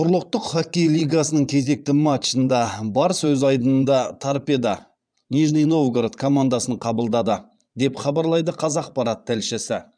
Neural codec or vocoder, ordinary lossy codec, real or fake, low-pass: vocoder, 22.05 kHz, 80 mel bands, Vocos; none; fake; 9.9 kHz